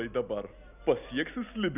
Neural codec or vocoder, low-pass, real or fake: none; 3.6 kHz; real